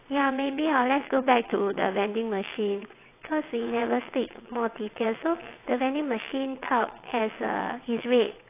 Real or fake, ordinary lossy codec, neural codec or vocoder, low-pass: fake; AAC, 24 kbps; vocoder, 22.05 kHz, 80 mel bands, WaveNeXt; 3.6 kHz